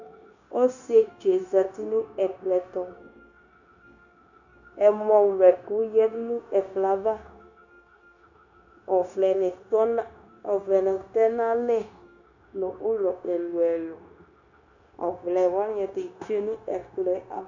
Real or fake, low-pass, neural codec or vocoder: fake; 7.2 kHz; codec, 16 kHz, 0.9 kbps, LongCat-Audio-Codec